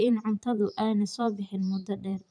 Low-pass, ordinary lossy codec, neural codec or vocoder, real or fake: 9.9 kHz; none; vocoder, 44.1 kHz, 128 mel bands every 512 samples, BigVGAN v2; fake